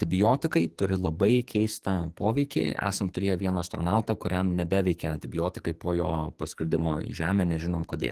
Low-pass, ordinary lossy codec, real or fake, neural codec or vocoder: 14.4 kHz; Opus, 24 kbps; fake; codec, 44.1 kHz, 2.6 kbps, SNAC